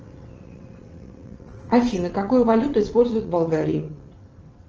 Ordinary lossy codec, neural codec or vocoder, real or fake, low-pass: Opus, 24 kbps; vocoder, 22.05 kHz, 80 mel bands, WaveNeXt; fake; 7.2 kHz